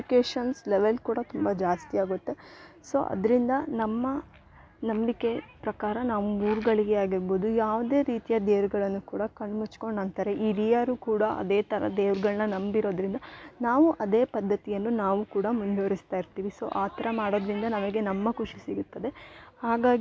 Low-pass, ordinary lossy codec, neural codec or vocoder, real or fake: none; none; none; real